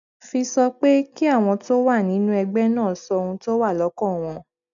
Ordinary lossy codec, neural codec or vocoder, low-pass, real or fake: MP3, 96 kbps; none; 7.2 kHz; real